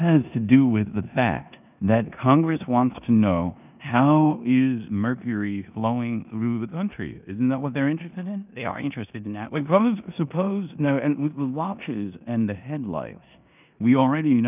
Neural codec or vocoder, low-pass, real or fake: codec, 16 kHz in and 24 kHz out, 0.9 kbps, LongCat-Audio-Codec, four codebook decoder; 3.6 kHz; fake